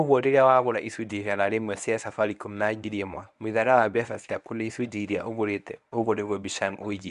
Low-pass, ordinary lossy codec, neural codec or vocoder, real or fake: 10.8 kHz; none; codec, 24 kHz, 0.9 kbps, WavTokenizer, medium speech release version 1; fake